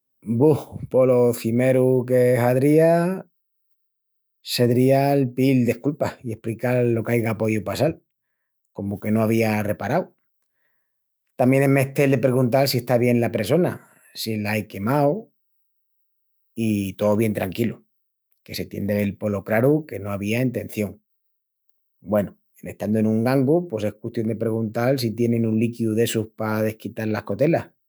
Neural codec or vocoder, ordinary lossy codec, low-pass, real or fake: autoencoder, 48 kHz, 128 numbers a frame, DAC-VAE, trained on Japanese speech; none; none; fake